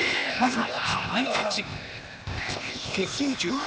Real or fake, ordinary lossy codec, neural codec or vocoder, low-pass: fake; none; codec, 16 kHz, 0.8 kbps, ZipCodec; none